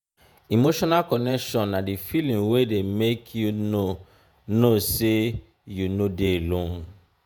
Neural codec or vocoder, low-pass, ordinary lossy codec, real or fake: vocoder, 48 kHz, 128 mel bands, Vocos; none; none; fake